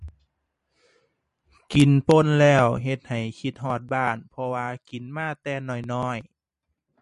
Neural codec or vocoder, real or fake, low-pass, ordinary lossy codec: vocoder, 44.1 kHz, 128 mel bands every 512 samples, BigVGAN v2; fake; 14.4 kHz; MP3, 48 kbps